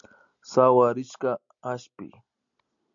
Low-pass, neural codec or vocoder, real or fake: 7.2 kHz; none; real